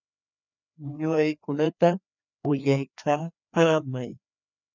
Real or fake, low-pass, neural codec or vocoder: fake; 7.2 kHz; codec, 16 kHz, 2 kbps, FreqCodec, larger model